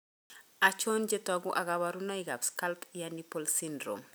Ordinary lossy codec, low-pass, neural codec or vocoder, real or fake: none; none; none; real